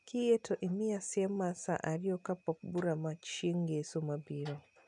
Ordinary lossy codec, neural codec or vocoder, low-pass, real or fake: none; none; 10.8 kHz; real